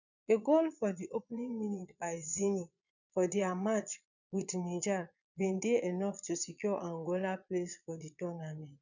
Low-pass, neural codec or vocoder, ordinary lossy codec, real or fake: 7.2 kHz; vocoder, 22.05 kHz, 80 mel bands, Vocos; none; fake